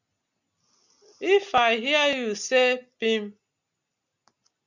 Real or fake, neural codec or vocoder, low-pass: real; none; 7.2 kHz